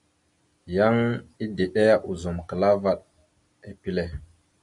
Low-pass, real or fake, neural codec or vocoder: 10.8 kHz; real; none